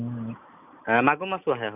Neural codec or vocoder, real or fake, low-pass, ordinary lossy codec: none; real; 3.6 kHz; none